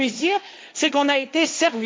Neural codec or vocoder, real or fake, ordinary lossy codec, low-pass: codec, 16 kHz, 1.1 kbps, Voila-Tokenizer; fake; none; none